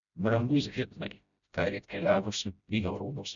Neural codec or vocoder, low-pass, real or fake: codec, 16 kHz, 0.5 kbps, FreqCodec, smaller model; 7.2 kHz; fake